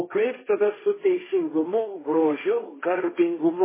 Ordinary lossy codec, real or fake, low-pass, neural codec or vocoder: MP3, 16 kbps; fake; 3.6 kHz; codec, 16 kHz, 1.1 kbps, Voila-Tokenizer